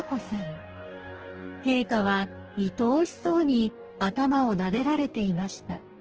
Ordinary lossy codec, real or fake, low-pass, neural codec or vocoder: Opus, 16 kbps; fake; 7.2 kHz; codec, 44.1 kHz, 2.6 kbps, DAC